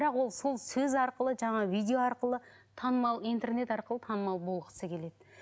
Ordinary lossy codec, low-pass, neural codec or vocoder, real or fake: none; none; none; real